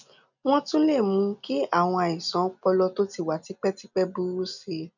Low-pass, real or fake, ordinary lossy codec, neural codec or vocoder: 7.2 kHz; real; none; none